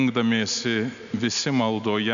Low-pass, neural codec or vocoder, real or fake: 7.2 kHz; none; real